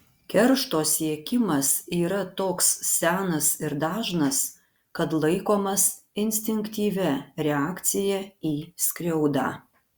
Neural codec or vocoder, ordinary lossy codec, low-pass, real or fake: none; Opus, 64 kbps; 19.8 kHz; real